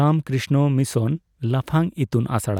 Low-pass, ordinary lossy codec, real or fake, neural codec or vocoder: 19.8 kHz; none; real; none